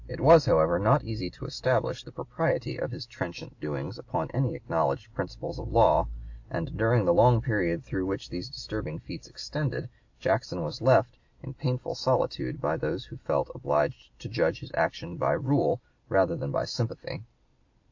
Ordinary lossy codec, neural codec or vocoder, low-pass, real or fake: AAC, 48 kbps; none; 7.2 kHz; real